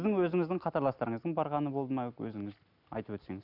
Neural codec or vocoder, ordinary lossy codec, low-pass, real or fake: none; none; 5.4 kHz; real